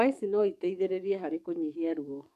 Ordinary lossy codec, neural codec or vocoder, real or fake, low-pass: none; codec, 44.1 kHz, 7.8 kbps, DAC; fake; 14.4 kHz